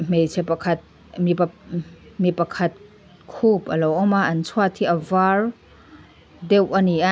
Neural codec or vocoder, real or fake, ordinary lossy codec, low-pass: none; real; none; none